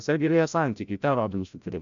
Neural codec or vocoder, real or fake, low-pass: codec, 16 kHz, 0.5 kbps, FreqCodec, larger model; fake; 7.2 kHz